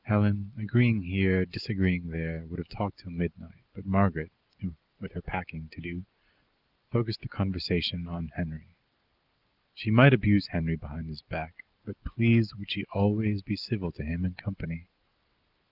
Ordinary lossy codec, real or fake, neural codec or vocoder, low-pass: Opus, 32 kbps; real; none; 5.4 kHz